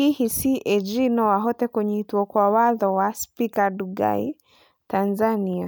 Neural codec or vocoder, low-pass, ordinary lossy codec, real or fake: none; none; none; real